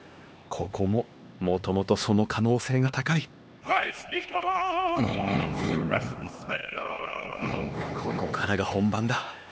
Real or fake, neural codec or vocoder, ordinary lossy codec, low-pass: fake; codec, 16 kHz, 2 kbps, X-Codec, HuBERT features, trained on LibriSpeech; none; none